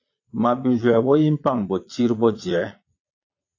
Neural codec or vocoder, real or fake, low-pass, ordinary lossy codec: vocoder, 22.05 kHz, 80 mel bands, Vocos; fake; 7.2 kHz; AAC, 48 kbps